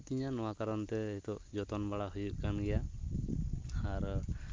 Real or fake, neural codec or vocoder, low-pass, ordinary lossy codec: real; none; 7.2 kHz; Opus, 24 kbps